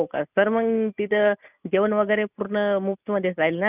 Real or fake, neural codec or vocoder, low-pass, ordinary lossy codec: real; none; 3.6 kHz; none